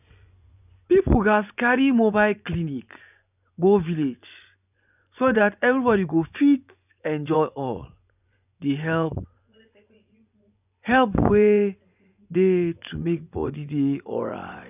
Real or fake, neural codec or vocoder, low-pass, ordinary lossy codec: fake; vocoder, 24 kHz, 100 mel bands, Vocos; 3.6 kHz; none